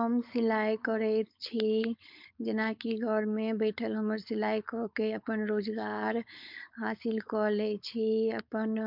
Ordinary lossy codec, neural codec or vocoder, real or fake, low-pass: MP3, 48 kbps; codec, 16 kHz, 4.8 kbps, FACodec; fake; 5.4 kHz